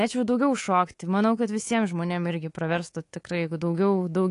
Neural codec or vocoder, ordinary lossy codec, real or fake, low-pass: codec, 24 kHz, 3.1 kbps, DualCodec; AAC, 48 kbps; fake; 10.8 kHz